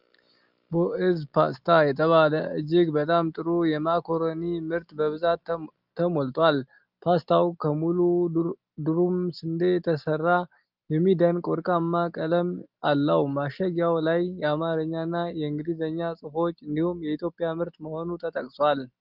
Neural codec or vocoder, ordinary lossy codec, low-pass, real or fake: none; Opus, 32 kbps; 5.4 kHz; real